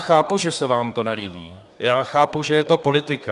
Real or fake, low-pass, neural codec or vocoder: fake; 10.8 kHz; codec, 24 kHz, 1 kbps, SNAC